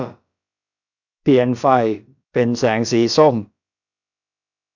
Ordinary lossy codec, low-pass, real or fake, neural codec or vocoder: none; 7.2 kHz; fake; codec, 16 kHz, about 1 kbps, DyCAST, with the encoder's durations